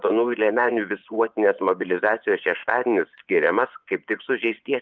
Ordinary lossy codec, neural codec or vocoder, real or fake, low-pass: Opus, 24 kbps; vocoder, 24 kHz, 100 mel bands, Vocos; fake; 7.2 kHz